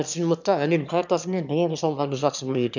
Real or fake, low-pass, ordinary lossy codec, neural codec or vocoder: fake; 7.2 kHz; none; autoencoder, 22.05 kHz, a latent of 192 numbers a frame, VITS, trained on one speaker